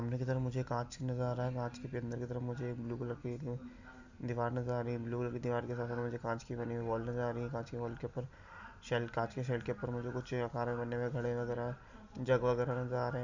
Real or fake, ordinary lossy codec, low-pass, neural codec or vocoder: real; Opus, 64 kbps; 7.2 kHz; none